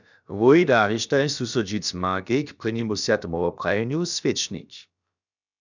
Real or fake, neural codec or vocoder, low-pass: fake; codec, 16 kHz, about 1 kbps, DyCAST, with the encoder's durations; 7.2 kHz